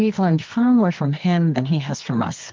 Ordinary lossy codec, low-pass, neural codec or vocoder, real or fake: Opus, 32 kbps; 7.2 kHz; codec, 24 kHz, 0.9 kbps, WavTokenizer, medium music audio release; fake